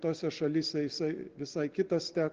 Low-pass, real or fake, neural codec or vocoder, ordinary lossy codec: 7.2 kHz; real; none; Opus, 16 kbps